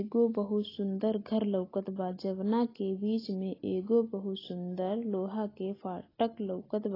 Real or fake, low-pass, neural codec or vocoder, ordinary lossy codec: real; 5.4 kHz; none; AAC, 24 kbps